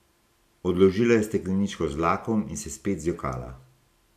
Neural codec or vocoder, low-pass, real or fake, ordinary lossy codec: none; 14.4 kHz; real; none